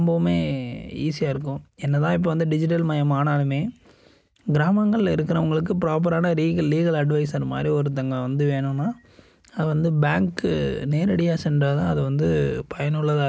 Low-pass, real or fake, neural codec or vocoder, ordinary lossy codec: none; real; none; none